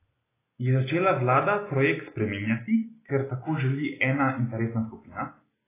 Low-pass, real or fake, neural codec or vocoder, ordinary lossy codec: 3.6 kHz; real; none; AAC, 16 kbps